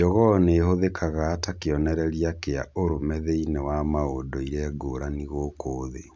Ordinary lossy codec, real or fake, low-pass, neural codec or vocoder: none; real; none; none